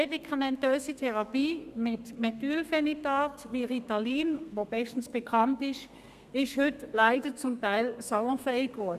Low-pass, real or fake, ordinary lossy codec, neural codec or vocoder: 14.4 kHz; fake; none; codec, 32 kHz, 1.9 kbps, SNAC